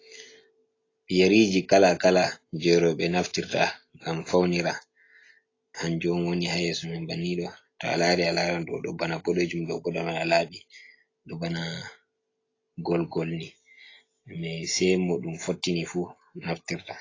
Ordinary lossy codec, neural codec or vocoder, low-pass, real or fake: AAC, 32 kbps; none; 7.2 kHz; real